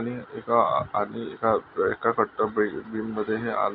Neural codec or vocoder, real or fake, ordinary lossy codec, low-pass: none; real; none; 5.4 kHz